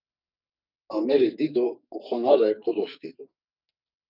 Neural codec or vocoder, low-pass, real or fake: codec, 44.1 kHz, 2.6 kbps, SNAC; 5.4 kHz; fake